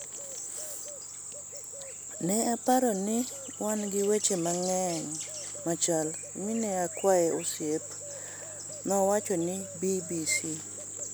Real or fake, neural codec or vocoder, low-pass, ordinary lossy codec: real; none; none; none